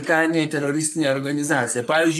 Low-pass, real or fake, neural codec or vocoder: 14.4 kHz; fake; codec, 44.1 kHz, 3.4 kbps, Pupu-Codec